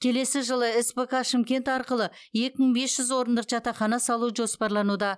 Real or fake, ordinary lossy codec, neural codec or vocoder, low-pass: real; none; none; none